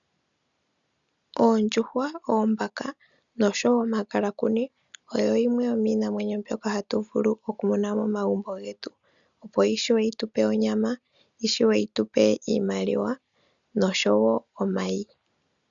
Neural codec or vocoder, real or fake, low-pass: none; real; 7.2 kHz